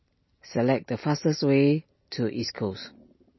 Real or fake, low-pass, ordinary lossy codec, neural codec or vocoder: real; 7.2 kHz; MP3, 24 kbps; none